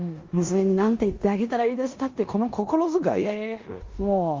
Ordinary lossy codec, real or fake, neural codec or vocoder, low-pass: Opus, 32 kbps; fake; codec, 16 kHz in and 24 kHz out, 0.9 kbps, LongCat-Audio-Codec, four codebook decoder; 7.2 kHz